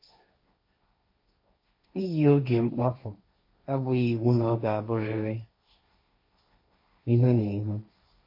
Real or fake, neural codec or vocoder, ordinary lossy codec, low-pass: fake; codec, 16 kHz, 1.1 kbps, Voila-Tokenizer; MP3, 32 kbps; 5.4 kHz